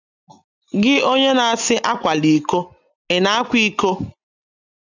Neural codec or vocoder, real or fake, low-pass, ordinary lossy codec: none; real; 7.2 kHz; none